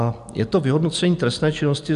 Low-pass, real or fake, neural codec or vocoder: 10.8 kHz; real; none